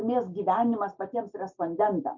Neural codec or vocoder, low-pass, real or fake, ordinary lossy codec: none; 7.2 kHz; real; MP3, 64 kbps